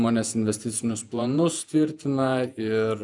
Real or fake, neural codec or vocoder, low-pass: fake; vocoder, 48 kHz, 128 mel bands, Vocos; 10.8 kHz